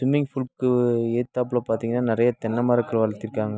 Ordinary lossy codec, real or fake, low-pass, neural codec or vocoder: none; real; none; none